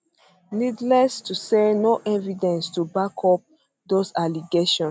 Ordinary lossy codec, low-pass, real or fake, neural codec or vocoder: none; none; real; none